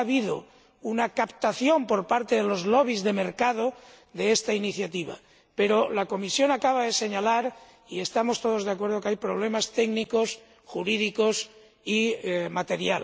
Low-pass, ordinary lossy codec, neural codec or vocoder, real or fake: none; none; none; real